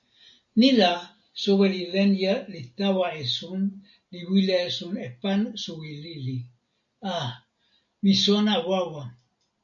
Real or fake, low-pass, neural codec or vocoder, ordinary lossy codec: real; 7.2 kHz; none; AAC, 64 kbps